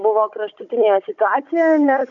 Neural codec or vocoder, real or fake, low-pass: codec, 16 kHz, 16 kbps, FunCodec, trained on Chinese and English, 50 frames a second; fake; 7.2 kHz